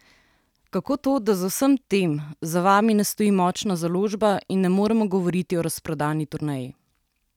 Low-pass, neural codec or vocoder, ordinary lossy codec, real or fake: 19.8 kHz; none; none; real